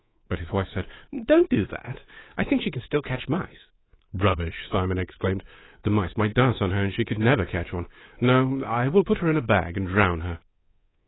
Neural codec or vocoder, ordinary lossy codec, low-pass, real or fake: codec, 24 kHz, 3.1 kbps, DualCodec; AAC, 16 kbps; 7.2 kHz; fake